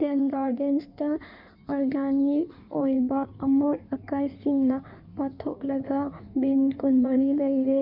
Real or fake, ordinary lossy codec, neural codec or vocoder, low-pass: fake; none; codec, 16 kHz in and 24 kHz out, 1.1 kbps, FireRedTTS-2 codec; 5.4 kHz